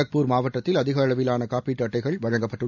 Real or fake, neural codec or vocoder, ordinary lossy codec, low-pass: real; none; none; 7.2 kHz